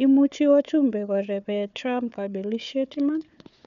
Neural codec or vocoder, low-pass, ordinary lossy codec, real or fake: codec, 16 kHz, 4 kbps, FunCodec, trained on Chinese and English, 50 frames a second; 7.2 kHz; none; fake